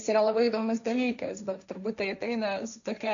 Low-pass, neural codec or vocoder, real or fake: 7.2 kHz; codec, 16 kHz, 1.1 kbps, Voila-Tokenizer; fake